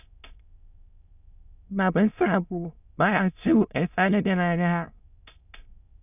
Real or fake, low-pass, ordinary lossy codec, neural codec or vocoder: fake; 3.6 kHz; none; autoencoder, 22.05 kHz, a latent of 192 numbers a frame, VITS, trained on many speakers